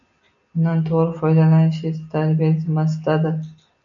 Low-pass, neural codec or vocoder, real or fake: 7.2 kHz; none; real